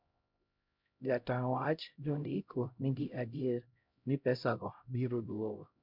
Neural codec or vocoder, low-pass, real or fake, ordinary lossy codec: codec, 16 kHz, 0.5 kbps, X-Codec, HuBERT features, trained on LibriSpeech; 5.4 kHz; fake; MP3, 48 kbps